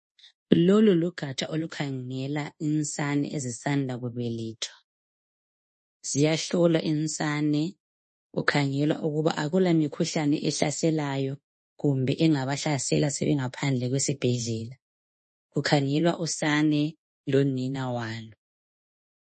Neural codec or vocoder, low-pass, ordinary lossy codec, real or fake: codec, 24 kHz, 1.2 kbps, DualCodec; 10.8 kHz; MP3, 32 kbps; fake